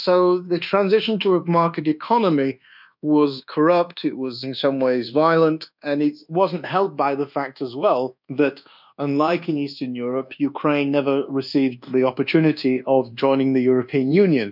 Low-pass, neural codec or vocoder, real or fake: 5.4 kHz; codec, 24 kHz, 1.2 kbps, DualCodec; fake